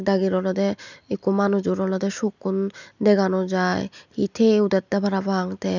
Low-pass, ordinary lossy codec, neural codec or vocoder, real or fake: 7.2 kHz; none; none; real